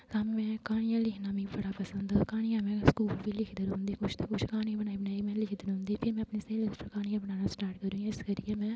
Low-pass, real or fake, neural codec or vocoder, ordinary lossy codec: none; real; none; none